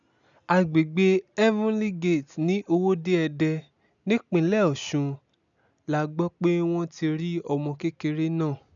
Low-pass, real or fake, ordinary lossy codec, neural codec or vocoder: 7.2 kHz; real; none; none